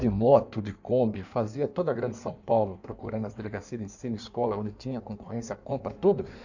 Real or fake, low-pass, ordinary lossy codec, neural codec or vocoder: fake; 7.2 kHz; none; codec, 16 kHz in and 24 kHz out, 1.1 kbps, FireRedTTS-2 codec